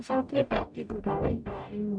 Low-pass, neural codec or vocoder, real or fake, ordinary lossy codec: 9.9 kHz; codec, 44.1 kHz, 0.9 kbps, DAC; fake; MP3, 64 kbps